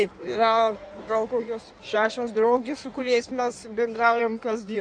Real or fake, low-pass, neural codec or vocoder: fake; 9.9 kHz; codec, 16 kHz in and 24 kHz out, 1.1 kbps, FireRedTTS-2 codec